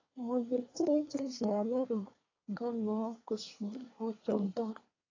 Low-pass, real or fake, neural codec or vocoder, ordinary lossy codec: 7.2 kHz; fake; codec, 24 kHz, 1 kbps, SNAC; AAC, 32 kbps